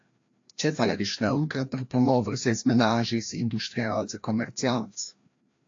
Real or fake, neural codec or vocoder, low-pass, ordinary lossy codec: fake; codec, 16 kHz, 1 kbps, FreqCodec, larger model; 7.2 kHz; AAC, 48 kbps